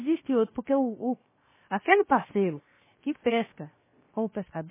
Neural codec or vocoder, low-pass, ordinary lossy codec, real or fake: codec, 16 kHz, 0.7 kbps, FocalCodec; 3.6 kHz; MP3, 16 kbps; fake